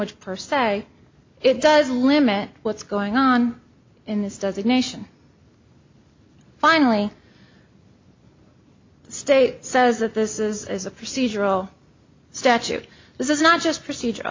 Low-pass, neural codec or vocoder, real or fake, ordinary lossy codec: 7.2 kHz; none; real; MP3, 48 kbps